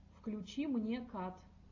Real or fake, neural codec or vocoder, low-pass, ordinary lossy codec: real; none; 7.2 kHz; AAC, 48 kbps